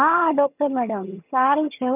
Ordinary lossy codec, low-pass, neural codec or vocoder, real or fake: none; 3.6 kHz; vocoder, 22.05 kHz, 80 mel bands, HiFi-GAN; fake